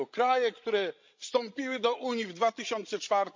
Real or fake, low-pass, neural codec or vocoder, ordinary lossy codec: fake; 7.2 kHz; codec, 16 kHz, 16 kbps, FreqCodec, larger model; MP3, 48 kbps